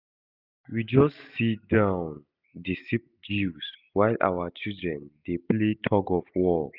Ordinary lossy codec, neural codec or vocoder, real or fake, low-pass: none; none; real; 5.4 kHz